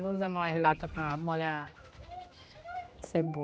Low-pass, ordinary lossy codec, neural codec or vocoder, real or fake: none; none; codec, 16 kHz, 1 kbps, X-Codec, HuBERT features, trained on general audio; fake